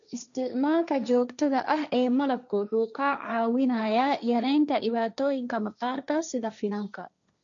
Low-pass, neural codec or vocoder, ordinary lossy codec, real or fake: 7.2 kHz; codec, 16 kHz, 1.1 kbps, Voila-Tokenizer; none; fake